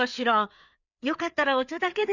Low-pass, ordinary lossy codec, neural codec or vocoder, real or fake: 7.2 kHz; none; codec, 16 kHz, 4 kbps, FreqCodec, larger model; fake